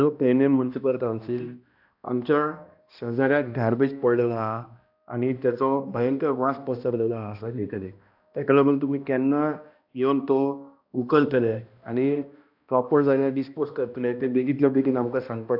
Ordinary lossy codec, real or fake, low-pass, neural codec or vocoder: AAC, 48 kbps; fake; 5.4 kHz; codec, 16 kHz, 1 kbps, X-Codec, HuBERT features, trained on balanced general audio